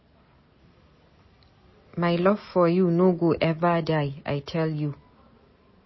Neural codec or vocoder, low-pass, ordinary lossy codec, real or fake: none; 7.2 kHz; MP3, 24 kbps; real